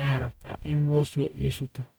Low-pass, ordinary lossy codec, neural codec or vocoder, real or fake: none; none; codec, 44.1 kHz, 0.9 kbps, DAC; fake